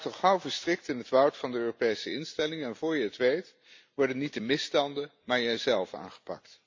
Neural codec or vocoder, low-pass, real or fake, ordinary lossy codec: none; 7.2 kHz; real; MP3, 48 kbps